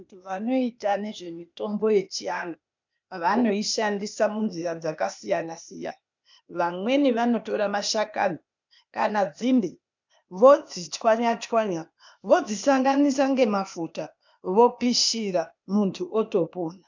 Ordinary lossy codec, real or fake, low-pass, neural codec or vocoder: MP3, 64 kbps; fake; 7.2 kHz; codec, 16 kHz, 0.8 kbps, ZipCodec